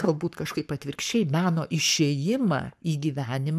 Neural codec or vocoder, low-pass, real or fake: codec, 44.1 kHz, 7.8 kbps, Pupu-Codec; 14.4 kHz; fake